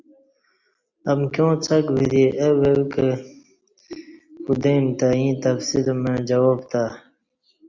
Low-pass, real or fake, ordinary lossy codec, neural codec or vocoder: 7.2 kHz; real; Opus, 64 kbps; none